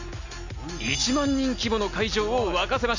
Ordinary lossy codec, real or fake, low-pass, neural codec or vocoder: none; real; 7.2 kHz; none